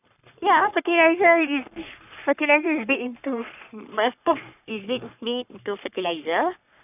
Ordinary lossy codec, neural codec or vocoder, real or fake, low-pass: none; codec, 44.1 kHz, 3.4 kbps, Pupu-Codec; fake; 3.6 kHz